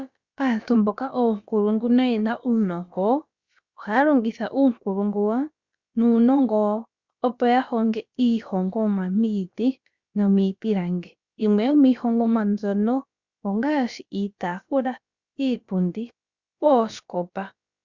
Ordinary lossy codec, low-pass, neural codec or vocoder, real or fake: Opus, 64 kbps; 7.2 kHz; codec, 16 kHz, about 1 kbps, DyCAST, with the encoder's durations; fake